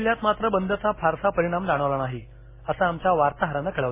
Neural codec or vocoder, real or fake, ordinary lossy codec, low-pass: none; real; MP3, 16 kbps; 3.6 kHz